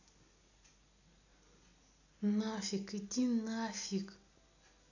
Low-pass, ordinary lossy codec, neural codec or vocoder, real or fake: 7.2 kHz; none; none; real